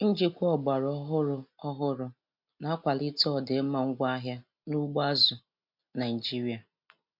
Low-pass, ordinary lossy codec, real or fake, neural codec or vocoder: 5.4 kHz; MP3, 48 kbps; real; none